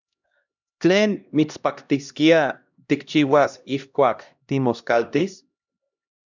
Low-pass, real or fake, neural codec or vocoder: 7.2 kHz; fake; codec, 16 kHz, 1 kbps, X-Codec, HuBERT features, trained on LibriSpeech